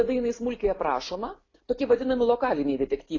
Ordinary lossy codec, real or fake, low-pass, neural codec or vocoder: AAC, 32 kbps; real; 7.2 kHz; none